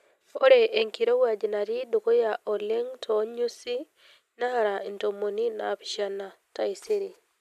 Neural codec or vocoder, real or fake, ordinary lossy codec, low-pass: none; real; MP3, 96 kbps; 14.4 kHz